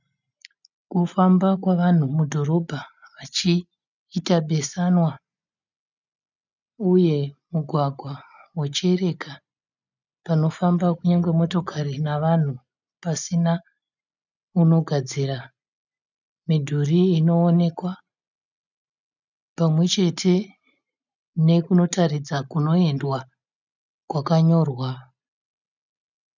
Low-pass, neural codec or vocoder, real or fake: 7.2 kHz; none; real